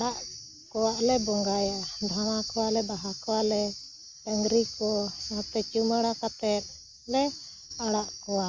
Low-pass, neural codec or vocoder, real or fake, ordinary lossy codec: 7.2 kHz; none; real; Opus, 32 kbps